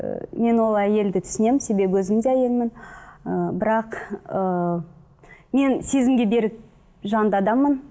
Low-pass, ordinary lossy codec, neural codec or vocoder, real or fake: none; none; none; real